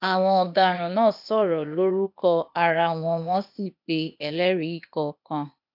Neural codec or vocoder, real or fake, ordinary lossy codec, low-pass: codec, 16 kHz, 0.8 kbps, ZipCodec; fake; none; 5.4 kHz